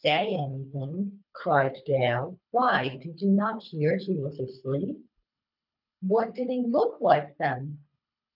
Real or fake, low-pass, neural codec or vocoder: fake; 5.4 kHz; codec, 24 kHz, 3 kbps, HILCodec